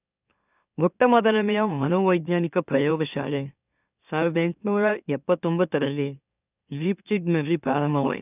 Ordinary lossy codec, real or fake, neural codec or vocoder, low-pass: none; fake; autoencoder, 44.1 kHz, a latent of 192 numbers a frame, MeloTTS; 3.6 kHz